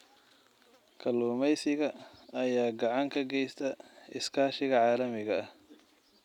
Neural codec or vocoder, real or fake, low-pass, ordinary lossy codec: none; real; 19.8 kHz; none